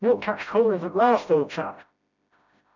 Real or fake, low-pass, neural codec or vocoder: fake; 7.2 kHz; codec, 16 kHz, 0.5 kbps, FreqCodec, smaller model